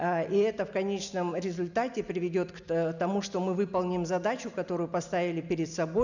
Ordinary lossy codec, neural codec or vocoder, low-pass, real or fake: none; none; 7.2 kHz; real